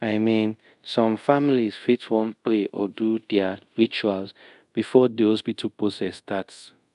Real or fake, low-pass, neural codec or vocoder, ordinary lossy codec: fake; 10.8 kHz; codec, 24 kHz, 0.5 kbps, DualCodec; none